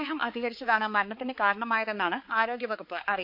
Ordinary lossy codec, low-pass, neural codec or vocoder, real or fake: MP3, 48 kbps; 5.4 kHz; codec, 16 kHz, 4 kbps, X-Codec, HuBERT features, trained on balanced general audio; fake